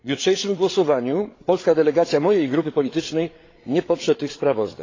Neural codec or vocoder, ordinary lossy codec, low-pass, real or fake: codec, 16 kHz, 8 kbps, FreqCodec, larger model; AAC, 32 kbps; 7.2 kHz; fake